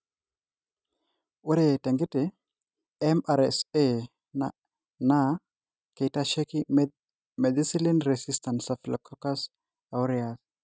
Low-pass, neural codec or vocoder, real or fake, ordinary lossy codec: none; none; real; none